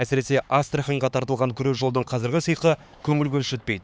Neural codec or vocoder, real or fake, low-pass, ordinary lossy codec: codec, 16 kHz, 4 kbps, X-Codec, HuBERT features, trained on LibriSpeech; fake; none; none